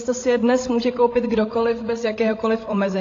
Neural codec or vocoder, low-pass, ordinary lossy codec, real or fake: codec, 16 kHz, 16 kbps, FreqCodec, larger model; 7.2 kHz; AAC, 32 kbps; fake